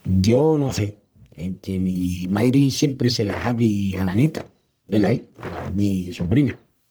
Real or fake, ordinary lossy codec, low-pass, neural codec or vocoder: fake; none; none; codec, 44.1 kHz, 1.7 kbps, Pupu-Codec